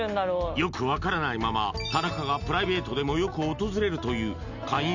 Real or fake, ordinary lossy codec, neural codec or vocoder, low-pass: real; none; none; 7.2 kHz